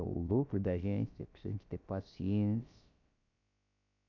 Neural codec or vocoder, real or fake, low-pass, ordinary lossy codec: codec, 16 kHz, about 1 kbps, DyCAST, with the encoder's durations; fake; 7.2 kHz; MP3, 64 kbps